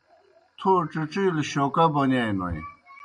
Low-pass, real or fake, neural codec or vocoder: 9.9 kHz; real; none